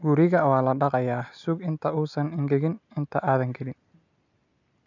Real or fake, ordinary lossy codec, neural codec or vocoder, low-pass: real; none; none; 7.2 kHz